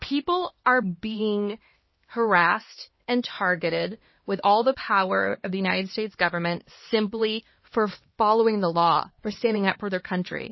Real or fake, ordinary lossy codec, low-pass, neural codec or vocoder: fake; MP3, 24 kbps; 7.2 kHz; codec, 16 kHz, 2 kbps, X-Codec, HuBERT features, trained on LibriSpeech